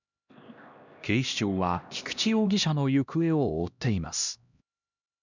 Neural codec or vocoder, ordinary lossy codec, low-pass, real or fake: codec, 16 kHz, 1 kbps, X-Codec, HuBERT features, trained on LibriSpeech; none; 7.2 kHz; fake